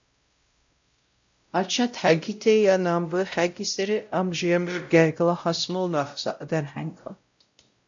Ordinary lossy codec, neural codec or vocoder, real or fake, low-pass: AAC, 64 kbps; codec, 16 kHz, 0.5 kbps, X-Codec, WavLM features, trained on Multilingual LibriSpeech; fake; 7.2 kHz